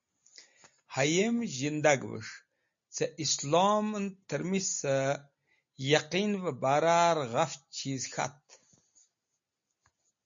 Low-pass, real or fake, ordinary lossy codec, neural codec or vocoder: 7.2 kHz; real; MP3, 48 kbps; none